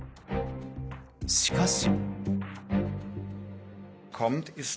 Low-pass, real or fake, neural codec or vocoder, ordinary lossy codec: none; real; none; none